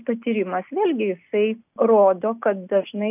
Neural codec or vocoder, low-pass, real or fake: none; 3.6 kHz; real